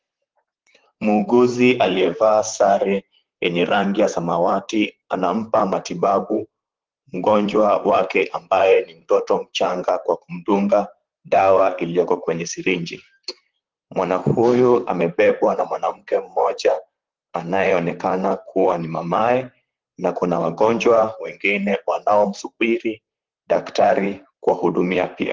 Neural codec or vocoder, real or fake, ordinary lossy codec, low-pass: vocoder, 44.1 kHz, 128 mel bands, Pupu-Vocoder; fake; Opus, 16 kbps; 7.2 kHz